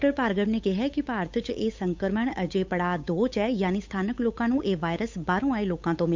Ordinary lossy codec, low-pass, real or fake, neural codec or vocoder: MP3, 64 kbps; 7.2 kHz; fake; codec, 16 kHz, 8 kbps, FunCodec, trained on Chinese and English, 25 frames a second